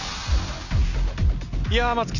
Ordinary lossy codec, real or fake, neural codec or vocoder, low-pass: none; real; none; 7.2 kHz